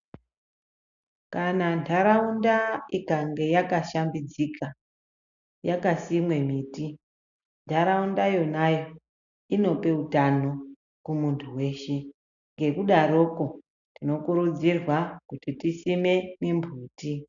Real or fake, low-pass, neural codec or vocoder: real; 7.2 kHz; none